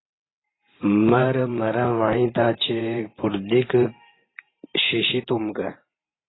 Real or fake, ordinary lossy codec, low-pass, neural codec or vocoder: fake; AAC, 16 kbps; 7.2 kHz; vocoder, 44.1 kHz, 128 mel bands every 512 samples, BigVGAN v2